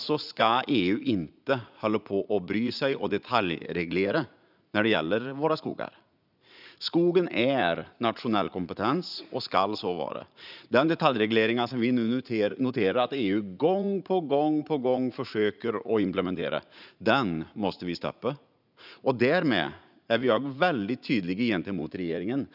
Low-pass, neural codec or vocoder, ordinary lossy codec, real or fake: 5.4 kHz; vocoder, 44.1 kHz, 80 mel bands, Vocos; none; fake